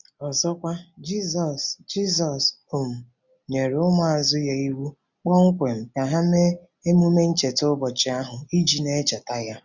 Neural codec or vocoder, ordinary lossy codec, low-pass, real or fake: none; none; 7.2 kHz; real